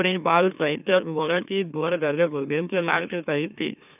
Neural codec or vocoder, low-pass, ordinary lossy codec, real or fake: autoencoder, 44.1 kHz, a latent of 192 numbers a frame, MeloTTS; 3.6 kHz; none; fake